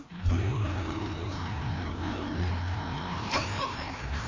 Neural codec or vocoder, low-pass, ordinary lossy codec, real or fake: codec, 16 kHz, 1 kbps, FreqCodec, larger model; 7.2 kHz; AAC, 32 kbps; fake